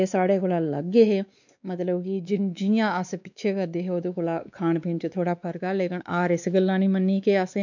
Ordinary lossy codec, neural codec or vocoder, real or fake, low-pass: none; codec, 16 kHz, 2 kbps, X-Codec, WavLM features, trained on Multilingual LibriSpeech; fake; 7.2 kHz